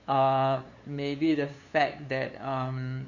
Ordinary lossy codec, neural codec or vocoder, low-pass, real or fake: none; codec, 16 kHz, 2 kbps, FunCodec, trained on LibriTTS, 25 frames a second; 7.2 kHz; fake